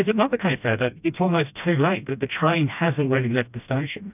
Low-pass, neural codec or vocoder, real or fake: 3.6 kHz; codec, 16 kHz, 1 kbps, FreqCodec, smaller model; fake